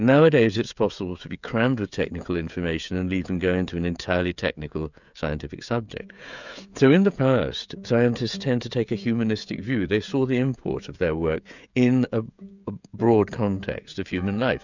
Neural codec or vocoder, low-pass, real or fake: codec, 16 kHz, 16 kbps, FreqCodec, smaller model; 7.2 kHz; fake